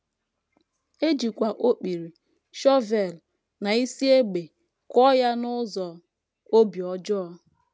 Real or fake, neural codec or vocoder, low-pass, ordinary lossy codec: real; none; none; none